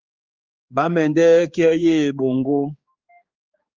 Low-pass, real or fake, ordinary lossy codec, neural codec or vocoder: 7.2 kHz; fake; Opus, 32 kbps; codec, 16 kHz, 4 kbps, X-Codec, HuBERT features, trained on general audio